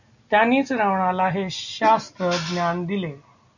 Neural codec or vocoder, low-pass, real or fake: none; 7.2 kHz; real